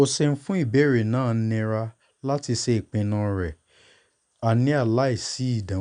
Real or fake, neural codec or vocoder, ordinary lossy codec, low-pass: real; none; none; 9.9 kHz